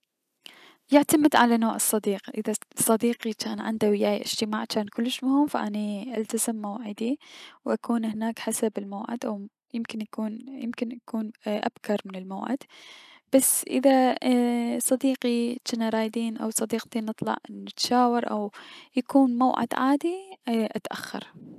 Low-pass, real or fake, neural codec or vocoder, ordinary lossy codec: 14.4 kHz; real; none; none